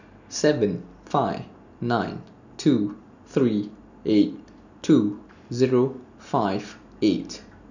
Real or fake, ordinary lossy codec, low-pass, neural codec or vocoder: real; none; 7.2 kHz; none